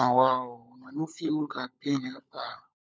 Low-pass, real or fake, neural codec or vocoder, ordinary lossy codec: none; fake; codec, 16 kHz, 16 kbps, FunCodec, trained on LibriTTS, 50 frames a second; none